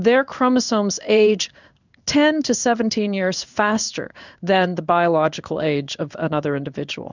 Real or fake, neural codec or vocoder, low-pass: fake; codec, 16 kHz in and 24 kHz out, 1 kbps, XY-Tokenizer; 7.2 kHz